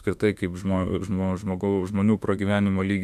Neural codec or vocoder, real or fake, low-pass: autoencoder, 48 kHz, 32 numbers a frame, DAC-VAE, trained on Japanese speech; fake; 14.4 kHz